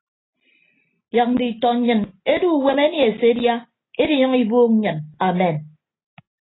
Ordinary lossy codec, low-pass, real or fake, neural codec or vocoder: AAC, 16 kbps; 7.2 kHz; real; none